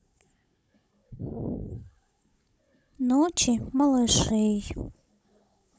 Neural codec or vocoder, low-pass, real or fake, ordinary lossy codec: codec, 16 kHz, 16 kbps, FunCodec, trained on Chinese and English, 50 frames a second; none; fake; none